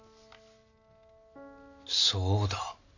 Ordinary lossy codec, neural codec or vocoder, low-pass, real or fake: none; none; 7.2 kHz; real